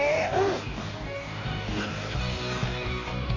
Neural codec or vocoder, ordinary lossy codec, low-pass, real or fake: codec, 44.1 kHz, 2.6 kbps, DAC; AAC, 32 kbps; 7.2 kHz; fake